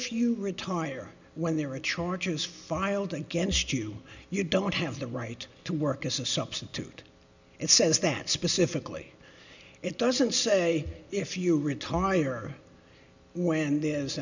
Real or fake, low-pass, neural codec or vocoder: real; 7.2 kHz; none